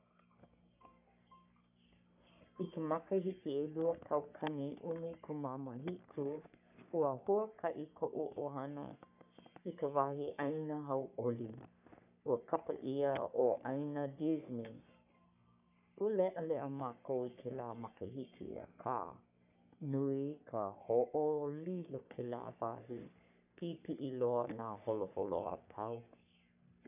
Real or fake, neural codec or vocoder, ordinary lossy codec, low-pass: fake; codec, 44.1 kHz, 3.4 kbps, Pupu-Codec; none; 3.6 kHz